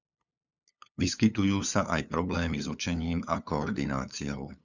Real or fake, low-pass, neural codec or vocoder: fake; 7.2 kHz; codec, 16 kHz, 8 kbps, FunCodec, trained on LibriTTS, 25 frames a second